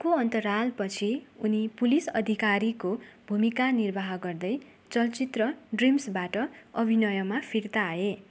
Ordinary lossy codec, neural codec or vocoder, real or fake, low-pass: none; none; real; none